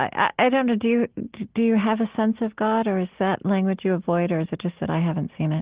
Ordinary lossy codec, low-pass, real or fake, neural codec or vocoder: Opus, 16 kbps; 3.6 kHz; real; none